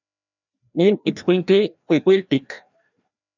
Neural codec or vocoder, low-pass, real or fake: codec, 16 kHz, 1 kbps, FreqCodec, larger model; 7.2 kHz; fake